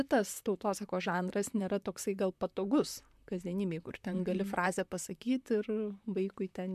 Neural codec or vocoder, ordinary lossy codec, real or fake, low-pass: vocoder, 44.1 kHz, 128 mel bands, Pupu-Vocoder; MP3, 96 kbps; fake; 14.4 kHz